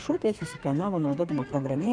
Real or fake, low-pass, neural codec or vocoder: fake; 9.9 kHz; codec, 32 kHz, 1.9 kbps, SNAC